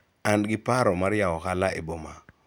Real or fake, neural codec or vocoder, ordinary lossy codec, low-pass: real; none; none; none